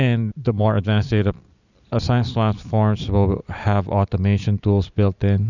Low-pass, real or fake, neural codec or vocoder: 7.2 kHz; real; none